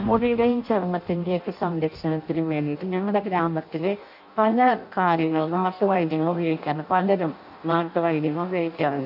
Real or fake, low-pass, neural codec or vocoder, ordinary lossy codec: fake; 5.4 kHz; codec, 16 kHz in and 24 kHz out, 0.6 kbps, FireRedTTS-2 codec; none